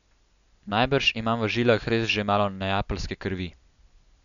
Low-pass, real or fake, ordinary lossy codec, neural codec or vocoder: 7.2 kHz; real; none; none